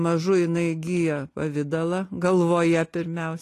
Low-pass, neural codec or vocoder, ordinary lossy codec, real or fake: 14.4 kHz; none; AAC, 48 kbps; real